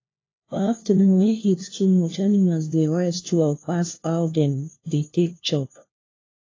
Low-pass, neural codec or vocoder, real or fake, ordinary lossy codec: 7.2 kHz; codec, 16 kHz, 1 kbps, FunCodec, trained on LibriTTS, 50 frames a second; fake; AAC, 32 kbps